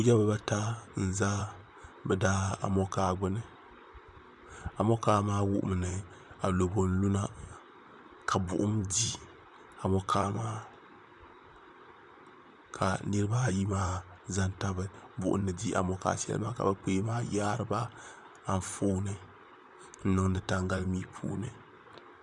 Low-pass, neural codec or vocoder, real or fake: 10.8 kHz; vocoder, 44.1 kHz, 128 mel bands, Pupu-Vocoder; fake